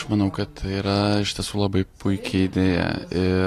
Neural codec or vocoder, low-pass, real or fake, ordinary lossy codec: none; 14.4 kHz; real; AAC, 48 kbps